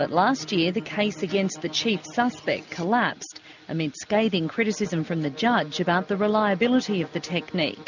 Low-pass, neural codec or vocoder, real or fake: 7.2 kHz; none; real